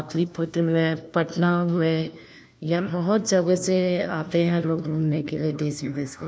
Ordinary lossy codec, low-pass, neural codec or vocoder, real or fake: none; none; codec, 16 kHz, 1 kbps, FunCodec, trained on LibriTTS, 50 frames a second; fake